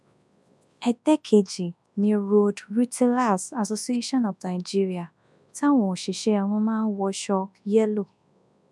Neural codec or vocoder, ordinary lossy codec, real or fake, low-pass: codec, 24 kHz, 0.9 kbps, WavTokenizer, large speech release; none; fake; none